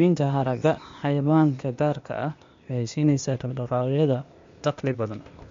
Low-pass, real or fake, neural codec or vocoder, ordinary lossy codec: 7.2 kHz; fake; codec, 16 kHz, 0.8 kbps, ZipCodec; MP3, 48 kbps